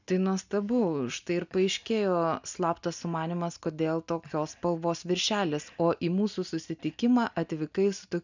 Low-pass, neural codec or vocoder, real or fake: 7.2 kHz; none; real